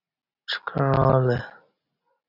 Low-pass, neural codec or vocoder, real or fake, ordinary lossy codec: 5.4 kHz; none; real; AAC, 48 kbps